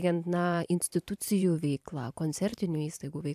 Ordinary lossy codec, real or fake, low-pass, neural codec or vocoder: AAC, 96 kbps; fake; 14.4 kHz; vocoder, 44.1 kHz, 128 mel bands every 512 samples, BigVGAN v2